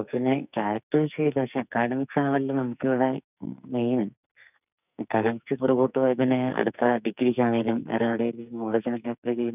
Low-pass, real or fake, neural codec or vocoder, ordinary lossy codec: 3.6 kHz; fake; codec, 44.1 kHz, 2.6 kbps, SNAC; none